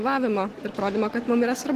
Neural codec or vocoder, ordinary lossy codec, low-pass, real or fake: none; Opus, 16 kbps; 14.4 kHz; real